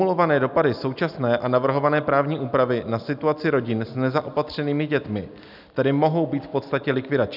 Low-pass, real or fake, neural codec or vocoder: 5.4 kHz; real; none